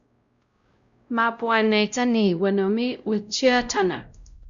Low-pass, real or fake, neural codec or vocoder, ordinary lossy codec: 7.2 kHz; fake; codec, 16 kHz, 0.5 kbps, X-Codec, WavLM features, trained on Multilingual LibriSpeech; Opus, 64 kbps